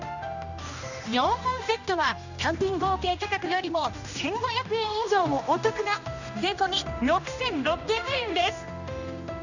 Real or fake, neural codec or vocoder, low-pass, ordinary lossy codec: fake; codec, 16 kHz, 1 kbps, X-Codec, HuBERT features, trained on general audio; 7.2 kHz; none